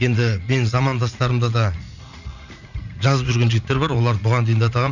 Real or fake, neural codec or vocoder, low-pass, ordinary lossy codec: fake; vocoder, 44.1 kHz, 80 mel bands, Vocos; 7.2 kHz; none